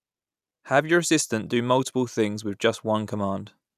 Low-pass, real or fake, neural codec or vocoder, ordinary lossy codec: 14.4 kHz; real; none; none